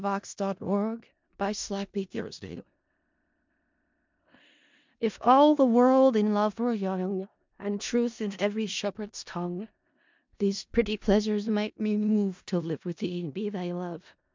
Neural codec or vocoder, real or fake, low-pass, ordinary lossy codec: codec, 16 kHz in and 24 kHz out, 0.4 kbps, LongCat-Audio-Codec, four codebook decoder; fake; 7.2 kHz; MP3, 64 kbps